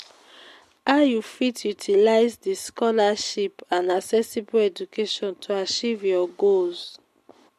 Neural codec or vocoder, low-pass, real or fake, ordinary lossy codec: none; 14.4 kHz; real; MP3, 64 kbps